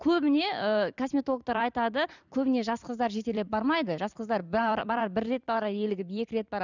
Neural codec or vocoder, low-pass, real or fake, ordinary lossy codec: vocoder, 44.1 kHz, 128 mel bands every 256 samples, BigVGAN v2; 7.2 kHz; fake; none